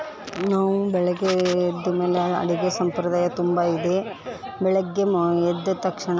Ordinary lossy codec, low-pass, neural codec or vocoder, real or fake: none; none; none; real